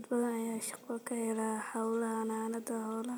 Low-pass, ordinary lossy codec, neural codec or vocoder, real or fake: none; none; none; real